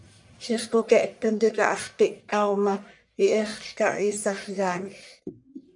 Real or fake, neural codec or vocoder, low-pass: fake; codec, 44.1 kHz, 1.7 kbps, Pupu-Codec; 10.8 kHz